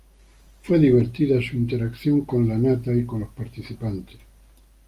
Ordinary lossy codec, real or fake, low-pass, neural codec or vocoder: Opus, 32 kbps; real; 14.4 kHz; none